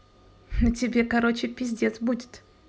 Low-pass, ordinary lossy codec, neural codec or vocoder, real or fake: none; none; none; real